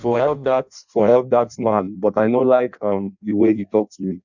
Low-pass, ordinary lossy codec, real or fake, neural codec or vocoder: 7.2 kHz; none; fake; codec, 16 kHz in and 24 kHz out, 0.6 kbps, FireRedTTS-2 codec